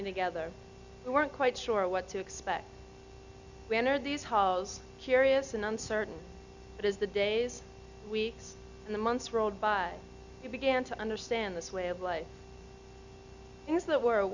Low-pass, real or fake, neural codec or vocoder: 7.2 kHz; real; none